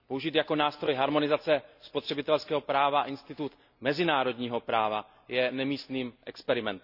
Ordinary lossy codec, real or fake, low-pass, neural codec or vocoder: none; real; 5.4 kHz; none